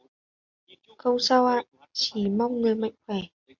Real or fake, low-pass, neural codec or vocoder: real; 7.2 kHz; none